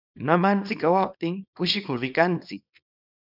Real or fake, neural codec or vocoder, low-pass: fake; codec, 24 kHz, 0.9 kbps, WavTokenizer, small release; 5.4 kHz